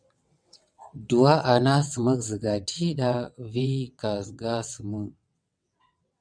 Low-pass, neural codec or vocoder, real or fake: 9.9 kHz; vocoder, 22.05 kHz, 80 mel bands, WaveNeXt; fake